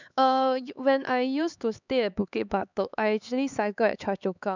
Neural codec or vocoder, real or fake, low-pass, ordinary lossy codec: codec, 16 kHz, 4 kbps, X-Codec, HuBERT features, trained on LibriSpeech; fake; 7.2 kHz; none